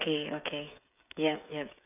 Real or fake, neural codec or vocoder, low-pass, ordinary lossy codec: fake; codec, 16 kHz, 8 kbps, FreqCodec, smaller model; 3.6 kHz; none